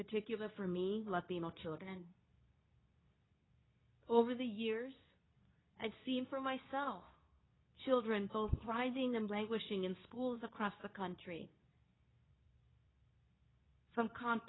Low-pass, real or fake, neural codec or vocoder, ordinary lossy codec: 7.2 kHz; fake; codec, 24 kHz, 0.9 kbps, WavTokenizer, medium speech release version 2; AAC, 16 kbps